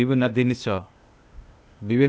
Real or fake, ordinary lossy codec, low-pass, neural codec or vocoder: fake; none; none; codec, 16 kHz, 0.8 kbps, ZipCodec